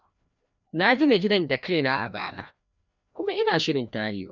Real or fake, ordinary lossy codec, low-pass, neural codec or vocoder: fake; none; 7.2 kHz; codec, 16 kHz, 1 kbps, FreqCodec, larger model